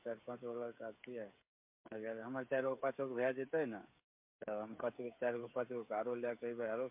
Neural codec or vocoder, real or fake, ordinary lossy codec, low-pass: codec, 16 kHz, 8 kbps, FreqCodec, smaller model; fake; MP3, 32 kbps; 3.6 kHz